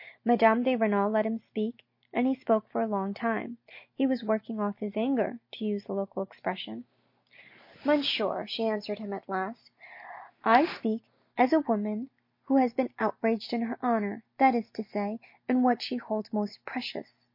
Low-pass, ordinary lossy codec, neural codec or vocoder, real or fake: 5.4 kHz; MP3, 32 kbps; none; real